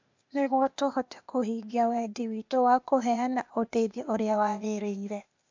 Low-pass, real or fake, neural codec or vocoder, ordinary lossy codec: 7.2 kHz; fake; codec, 16 kHz, 0.8 kbps, ZipCodec; MP3, 64 kbps